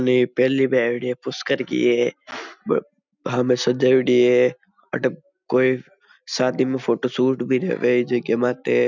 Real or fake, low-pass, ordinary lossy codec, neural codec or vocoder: real; 7.2 kHz; none; none